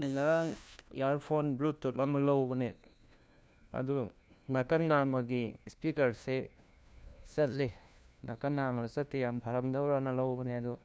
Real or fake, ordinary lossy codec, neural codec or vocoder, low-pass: fake; none; codec, 16 kHz, 1 kbps, FunCodec, trained on LibriTTS, 50 frames a second; none